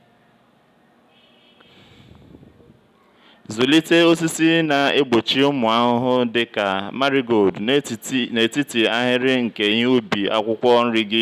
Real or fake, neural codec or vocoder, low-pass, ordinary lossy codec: real; none; 14.4 kHz; none